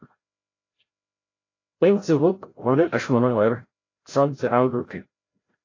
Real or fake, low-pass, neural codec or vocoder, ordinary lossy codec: fake; 7.2 kHz; codec, 16 kHz, 0.5 kbps, FreqCodec, larger model; AAC, 32 kbps